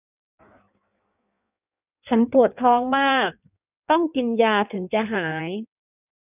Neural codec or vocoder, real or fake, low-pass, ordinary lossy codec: codec, 16 kHz in and 24 kHz out, 1.1 kbps, FireRedTTS-2 codec; fake; 3.6 kHz; none